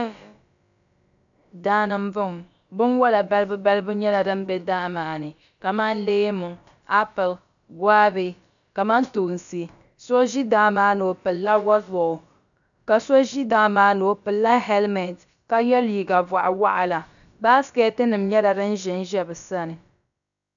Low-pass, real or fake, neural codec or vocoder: 7.2 kHz; fake; codec, 16 kHz, about 1 kbps, DyCAST, with the encoder's durations